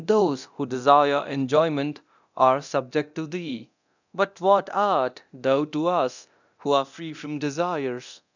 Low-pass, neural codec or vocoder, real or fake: 7.2 kHz; codec, 24 kHz, 0.9 kbps, DualCodec; fake